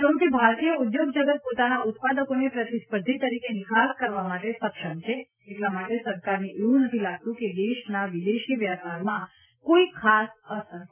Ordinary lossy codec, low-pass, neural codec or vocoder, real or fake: none; 3.6 kHz; none; real